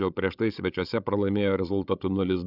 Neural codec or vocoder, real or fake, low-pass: codec, 16 kHz, 16 kbps, FreqCodec, larger model; fake; 5.4 kHz